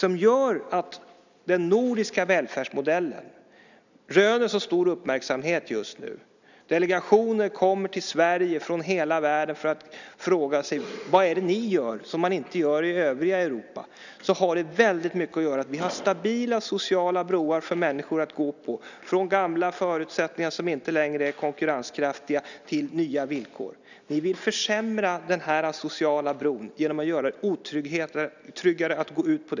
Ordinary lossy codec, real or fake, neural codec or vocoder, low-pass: none; real; none; 7.2 kHz